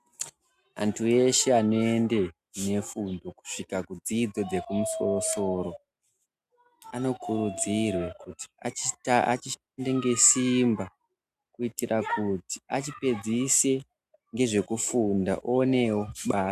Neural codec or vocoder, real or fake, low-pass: none; real; 14.4 kHz